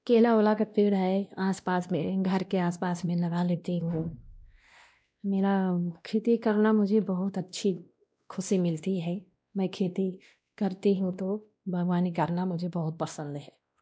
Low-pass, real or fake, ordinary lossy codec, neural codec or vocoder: none; fake; none; codec, 16 kHz, 1 kbps, X-Codec, WavLM features, trained on Multilingual LibriSpeech